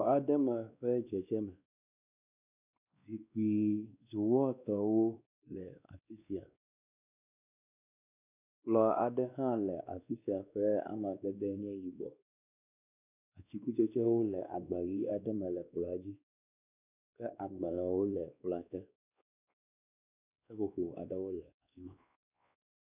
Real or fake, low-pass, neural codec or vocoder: fake; 3.6 kHz; codec, 16 kHz, 2 kbps, X-Codec, WavLM features, trained on Multilingual LibriSpeech